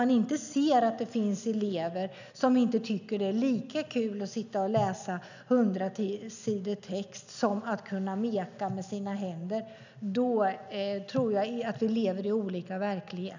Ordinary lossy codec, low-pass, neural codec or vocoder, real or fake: none; 7.2 kHz; none; real